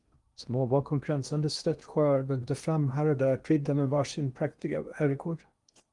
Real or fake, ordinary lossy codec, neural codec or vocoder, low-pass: fake; Opus, 32 kbps; codec, 16 kHz in and 24 kHz out, 0.8 kbps, FocalCodec, streaming, 65536 codes; 10.8 kHz